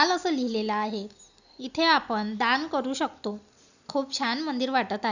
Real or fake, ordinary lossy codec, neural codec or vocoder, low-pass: real; none; none; 7.2 kHz